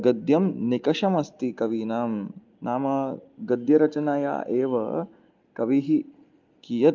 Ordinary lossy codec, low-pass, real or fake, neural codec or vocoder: Opus, 32 kbps; 7.2 kHz; real; none